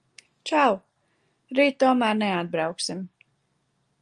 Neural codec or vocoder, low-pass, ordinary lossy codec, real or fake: none; 10.8 kHz; Opus, 32 kbps; real